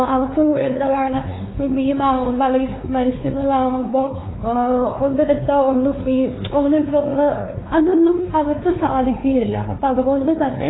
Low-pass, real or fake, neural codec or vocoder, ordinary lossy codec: 7.2 kHz; fake; codec, 16 kHz, 2 kbps, X-Codec, WavLM features, trained on Multilingual LibriSpeech; AAC, 16 kbps